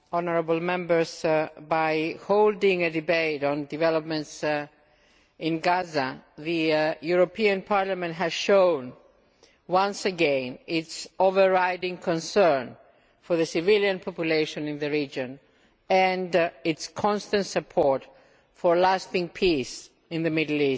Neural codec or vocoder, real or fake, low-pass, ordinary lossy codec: none; real; none; none